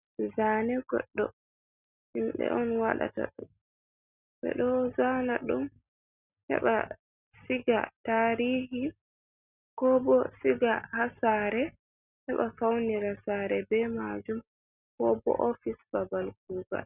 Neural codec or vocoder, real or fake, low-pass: none; real; 3.6 kHz